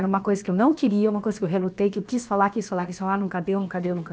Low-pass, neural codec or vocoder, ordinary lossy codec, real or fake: none; codec, 16 kHz, about 1 kbps, DyCAST, with the encoder's durations; none; fake